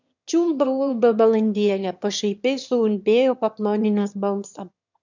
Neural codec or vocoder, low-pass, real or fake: autoencoder, 22.05 kHz, a latent of 192 numbers a frame, VITS, trained on one speaker; 7.2 kHz; fake